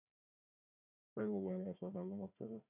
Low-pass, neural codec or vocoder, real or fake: 3.6 kHz; codec, 16 kHz, 1 kbps, FunCodec, trained on Chinese and English, 50 frames a second; fake